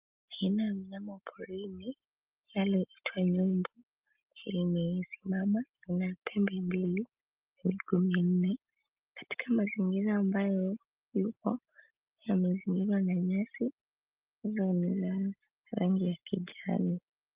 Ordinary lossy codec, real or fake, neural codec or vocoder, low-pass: Opus, 32 kbps; real; none; 3.6 kHz